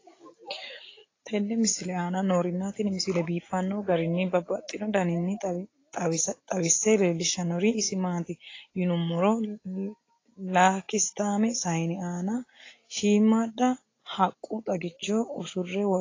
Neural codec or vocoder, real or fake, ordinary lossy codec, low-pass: none; real; AAC, 32 kbps; 7.2 kHz